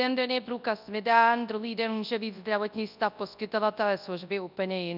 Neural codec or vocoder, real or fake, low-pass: codec, 24 kHz, 0.9 kbps, WavTokenizer, large speech release; fake; 5.4 kHz